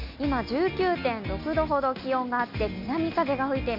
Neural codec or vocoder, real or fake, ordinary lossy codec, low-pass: none; real; none; 5.4 kHz